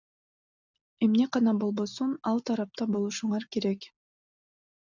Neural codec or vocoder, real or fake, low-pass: none; real; 7.2 kHz